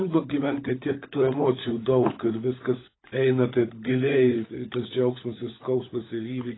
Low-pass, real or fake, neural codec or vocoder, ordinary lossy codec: 7.2 kHz; fake; codec, 16 kHz, 16 kbps, FunCodec, trained on LibriTTS, 50 frames a second; AAC, 16 kbps